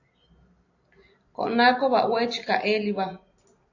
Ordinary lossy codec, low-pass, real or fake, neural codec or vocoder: AAC, 48 kbps; 7.2 kHz; real; none